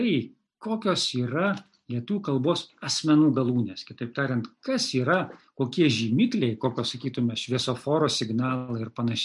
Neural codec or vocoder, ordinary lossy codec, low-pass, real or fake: none; MP3, 96 kbps; 10.8 kHz; real